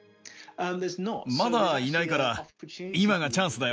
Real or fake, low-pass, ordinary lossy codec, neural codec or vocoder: real; none; none; none